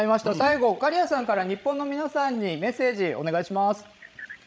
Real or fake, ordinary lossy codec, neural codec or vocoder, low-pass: fake; none; codec, 16 kHz, 16 kbps, FreqCodec, larger model; none